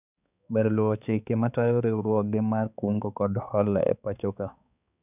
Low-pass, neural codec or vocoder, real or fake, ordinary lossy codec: 3.6 kHz; codec, 16 kHz, 4 kbps, X-Codec, HuBERT features, trained on balanced general audio; fake; none